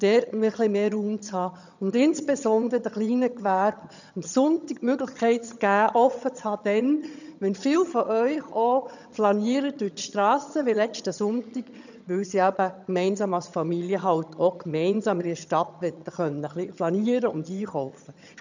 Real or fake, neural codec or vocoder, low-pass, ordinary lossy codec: fake; vocoder, 22.05 kHz, 80 mel bands, HiFi-GAN; 7.2 kHz; none